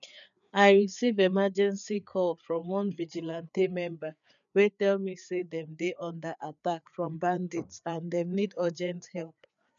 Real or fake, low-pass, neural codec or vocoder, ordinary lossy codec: fake; 7.2 kHz; codec, 16 kHz, 4 kbps, FreqCodec, larger model; none